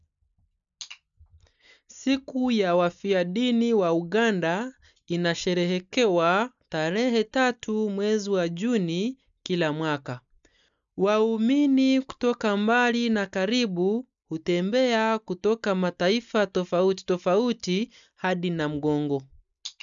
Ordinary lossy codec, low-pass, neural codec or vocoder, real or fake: none; 7.2 kHz; none; real